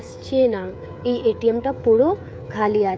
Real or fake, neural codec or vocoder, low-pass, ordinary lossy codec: fake; codec, 16 kHz, 16 kbps, FreqCodec, smaller model; none; none